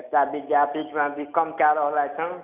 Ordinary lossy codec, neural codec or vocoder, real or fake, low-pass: none; none; real; 3.6 kHz